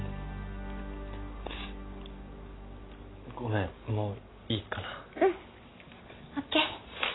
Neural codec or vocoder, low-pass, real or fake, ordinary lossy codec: none; 7.2 kHz; real; AAC, 16 kbps